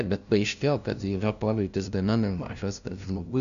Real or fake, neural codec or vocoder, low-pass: fake; codec, 16 kHz, 0.5 kbps, FunCodec, trained on LibriTTS, 25 frames a second; 7.2 kHz